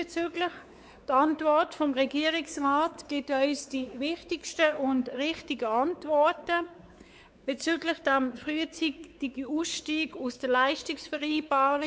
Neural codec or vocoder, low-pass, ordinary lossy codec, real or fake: codec, 16 kHz, 4 kbps, X-Codec, WavLM features, trained on Multilingual LibriSpeech; none; none; fake